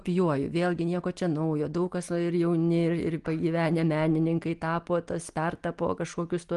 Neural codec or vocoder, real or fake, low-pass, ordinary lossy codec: none; real; 10.8 kHz; Opus, 24 kbps